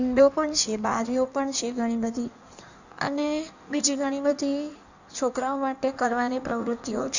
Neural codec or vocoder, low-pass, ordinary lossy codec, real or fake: codec, 16 kHz in and 24 kHz out, 1.1 kbps, FireRedTTS-2 codec; 7.2 kHz; none; fake